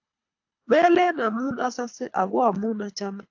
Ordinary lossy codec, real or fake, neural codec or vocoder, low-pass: AAC, 48 kbps; fake; codec, 24 kHz, 3 kbps, HILCodec; 7.2 kHz